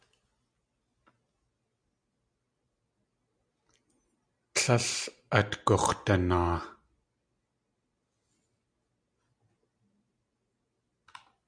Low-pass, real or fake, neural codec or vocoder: 9.9 kHz; real; none